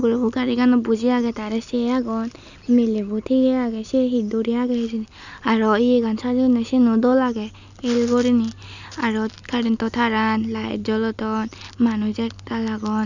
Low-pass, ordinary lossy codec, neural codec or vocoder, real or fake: 7.2 kHz; none; none; real